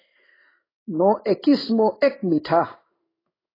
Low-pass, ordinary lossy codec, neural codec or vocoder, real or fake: 5.4 kHz; AAC, 24 kbps; codec, 16 kHz in and 24 kHz out, 1 kbps, XY-Tokenizer; fake